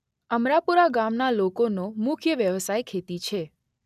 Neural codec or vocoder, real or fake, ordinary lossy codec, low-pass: none; real; none; 14.4 kHz